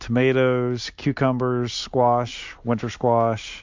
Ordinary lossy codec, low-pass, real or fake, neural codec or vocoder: MP3, 64 kbps; 7.2 kHz; real; none